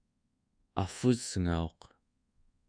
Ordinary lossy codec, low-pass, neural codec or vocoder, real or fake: MP3, 96 kbps; 9.9 kHz; codec, 24 kHz, 1.2 kbps, DualCodec; fake